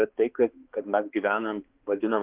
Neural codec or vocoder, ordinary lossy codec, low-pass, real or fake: codec, 16 kHz, 2 kbps, X-Codec, HuBERT features, trained on balanced general audio; Opus, 16 kbps; 3.6 kHz; fake